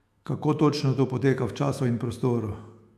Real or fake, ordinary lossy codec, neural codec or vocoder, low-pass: fake; AAC, 96 kbps; autoencoder, 48 kHz, 128 numbers a frame, DAC-VAE, trained on Japanese speech; 14.4 kHz